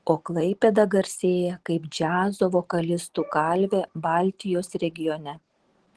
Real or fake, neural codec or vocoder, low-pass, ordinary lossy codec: real; none; 10.8 kHz; Opus, 16 kbps